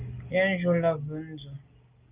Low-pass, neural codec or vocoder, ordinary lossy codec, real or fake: 3.6 kHz; none; Opus, 32 kbps; real